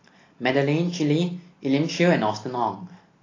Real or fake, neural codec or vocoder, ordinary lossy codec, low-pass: real; none; AAC, 32 kbps; 7.2 kHz